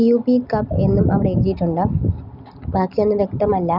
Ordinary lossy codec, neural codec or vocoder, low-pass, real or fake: Opus, 64 kbps; none; 5.4 kHz; real